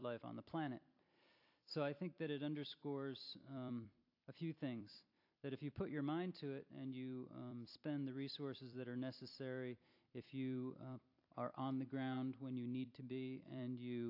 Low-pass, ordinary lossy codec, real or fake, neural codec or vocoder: 5.4 kHz; AAC, 48 kbps; fake; vocoder, 44.1 kHz, 128 mel bands every 256 samples, BigVGAN v2